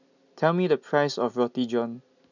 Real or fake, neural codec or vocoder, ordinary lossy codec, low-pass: real; none; none; 7.2 kHz